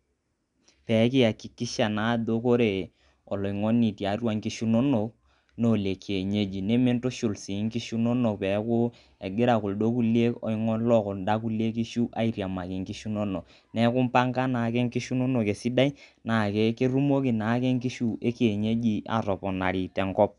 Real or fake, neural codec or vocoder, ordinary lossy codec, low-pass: real; none; none; 10.8 kHz